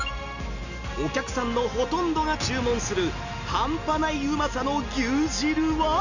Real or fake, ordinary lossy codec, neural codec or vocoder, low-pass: real; none; none; 7.2 kHz